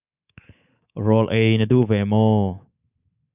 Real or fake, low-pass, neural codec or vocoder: fake; 3.6 kHz; codec, 24 kHz, 3.1 kbps, DualCodec